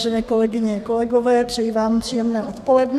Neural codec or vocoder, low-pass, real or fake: codec, 32 kHz, 1.9 kbps, SNAC; 14.4 kHz; fake